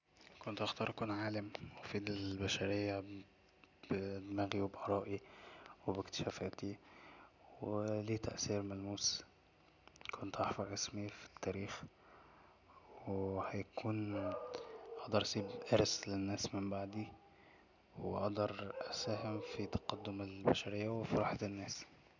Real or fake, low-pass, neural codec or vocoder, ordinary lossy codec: real; 7.2 kHz; none; none